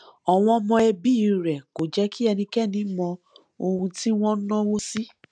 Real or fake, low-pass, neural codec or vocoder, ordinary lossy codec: real; 9.9 kHz; none; none